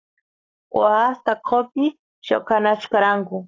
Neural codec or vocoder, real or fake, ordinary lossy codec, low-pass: codec, 44.1 kHz, 7.8 kbps, Pupu-Codec; fake; AAC, 32 kbps; 7.2 kHz